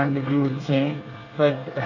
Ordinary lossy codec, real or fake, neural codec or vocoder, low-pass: none; fake; codec, 24 kHz, 1 kbps, SNAC; 7.2 kHz